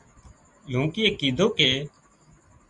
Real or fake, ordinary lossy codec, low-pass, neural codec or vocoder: real; Opus, 64 kbps; 10.8 kHz; none